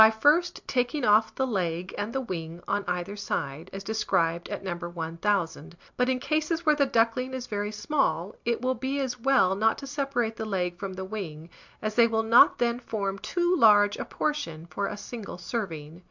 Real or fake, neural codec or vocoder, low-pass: real; none; 7.2 kHz